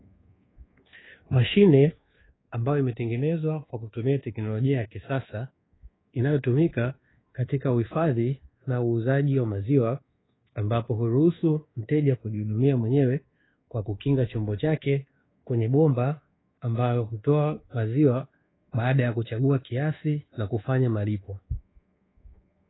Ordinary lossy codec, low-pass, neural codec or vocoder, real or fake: AAC, 16 kbps; 7.2 kHz; codec, 24 kHz, 1.2 kbps, DualCodec; fake